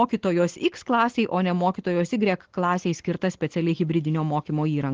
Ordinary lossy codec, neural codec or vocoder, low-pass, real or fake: Opus, 16 kbps; none; 7.2 kHz; real